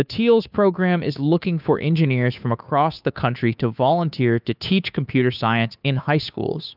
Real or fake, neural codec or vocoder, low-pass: fake; codec, 16 kHz, 2 kbps, FunCodec, trained on Chinese and English, 25 frames a second; 5.4 kHz